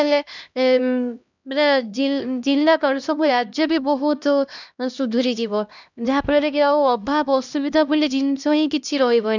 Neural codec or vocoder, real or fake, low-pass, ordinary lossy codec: codec, 16 kHz, 1 kbps, X-Codec, HuBERT features, trained on LibriSpeech; fake; 7.2 kHz; none